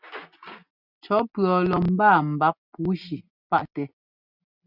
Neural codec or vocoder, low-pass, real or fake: none; 5.4 kHz; real